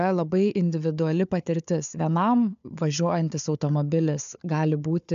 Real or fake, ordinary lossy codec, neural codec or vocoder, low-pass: fake; AAC, 96 kbps; codec, 16 kHz, 4 kbps, FunCodec, trained on Chinese and English, 50 frames a second; 7.2 kHz